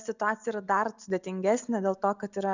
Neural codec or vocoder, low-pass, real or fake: none; 7.2 kHz; real